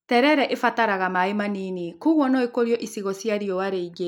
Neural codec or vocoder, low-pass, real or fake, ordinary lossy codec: none; 19.8 kHz; real; none